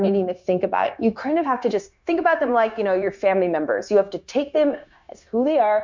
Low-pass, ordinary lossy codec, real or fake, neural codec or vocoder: 7.2 kHz; MP3, 64 kbps; fake; codec, 16 kHz, 0.9 kbps, LongCat-Audio-Codec